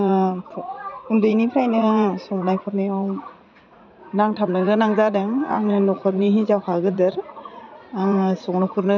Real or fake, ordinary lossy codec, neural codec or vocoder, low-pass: fake; none; vocoder, 44.1 kHz, 80 mel bands, Vocos; 7.2 kHz